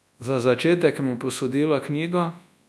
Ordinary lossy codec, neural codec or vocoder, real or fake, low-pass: none; codec, 24 kHz, 0.9 kbps, WavTokenizer, large speech release; fake; none